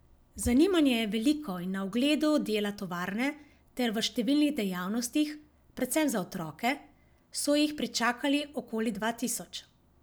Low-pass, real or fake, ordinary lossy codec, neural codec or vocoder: none; real; none; none